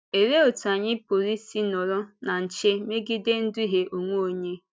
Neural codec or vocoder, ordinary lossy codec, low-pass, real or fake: none; none; none; real